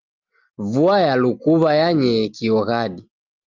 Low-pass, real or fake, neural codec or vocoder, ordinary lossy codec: 7.2 kHz; real; none; Opus, 32 kbps